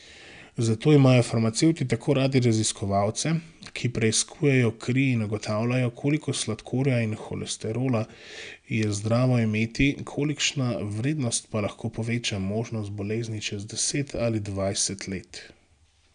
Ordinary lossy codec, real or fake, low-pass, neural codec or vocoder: none; real; 9.9 kHz; none